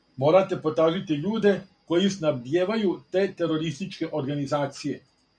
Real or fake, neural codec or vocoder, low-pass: real; none; 9.9 kHz